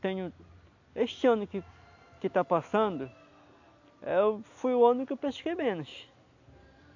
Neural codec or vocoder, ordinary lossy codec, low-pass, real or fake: none; AAC, 48 kbps; 7.2 kHz; real